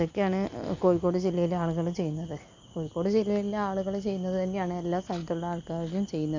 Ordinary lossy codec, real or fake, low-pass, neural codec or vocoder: MP3, 48 kbps; real; 7.2 kHz; none